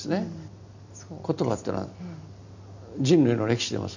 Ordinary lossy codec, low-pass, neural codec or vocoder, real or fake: none; 7.2 kHz; none; real